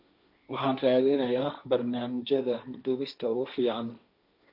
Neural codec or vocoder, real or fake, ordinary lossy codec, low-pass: codec, 16 kHz, 1.1 kbps, Voila-Tokenizer; fake; none; 5.4 kHz